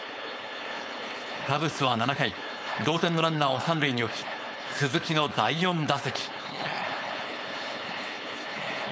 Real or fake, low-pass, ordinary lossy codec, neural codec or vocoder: fake; none; none; codec, 16 kHz, 4.8 kbps, FACodec